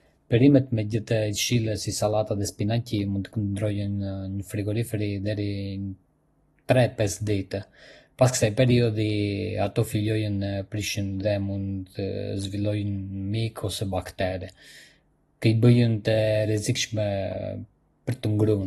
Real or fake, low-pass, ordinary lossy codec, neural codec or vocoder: real; 19.8 kHz; AAC, 32 kbps; none